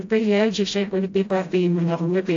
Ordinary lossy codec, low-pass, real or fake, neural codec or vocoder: AAC, 48 kbps; 7.2 kHz; fake; codec, 16 kHz, 0.5 kbps, FreqCodec, smaller model